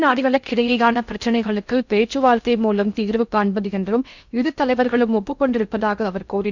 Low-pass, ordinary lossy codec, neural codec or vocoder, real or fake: 7.2 kHz; none; codec, 16 kHz in and 24 kHz out, 0.6 kbps, FocalCodec, streaming, 4096 codes; fake